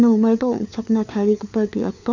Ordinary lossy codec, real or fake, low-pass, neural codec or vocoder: none; fake; 7.2 kHz; codec, 16 kHz, 4 kbps, FunCodec, trained on LibriTTS, 50 frames a second